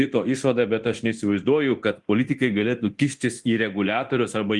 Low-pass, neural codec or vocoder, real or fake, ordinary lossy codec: 10.8 kHz; codec, 24 kHz, 0.9 kbps, DualCodec; fake; Opus, 24 kbps